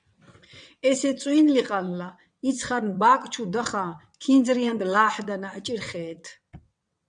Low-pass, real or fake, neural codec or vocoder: 9.9 kHz; fake; vocoder, 22.05 kHz, 80 mel bands, WaveNeXt